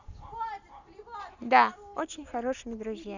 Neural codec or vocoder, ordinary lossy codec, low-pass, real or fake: none; none; 7.2 kHz; real